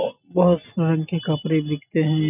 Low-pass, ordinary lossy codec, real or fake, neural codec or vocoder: 3.6 kHz; none; real; none